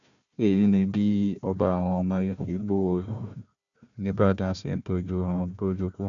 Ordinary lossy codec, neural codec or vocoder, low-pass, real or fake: none; codec, 16 kHz, 1 kbps, FunCodec, trained on Chinese and English, 50 frames a second; 7.2 kHz; fake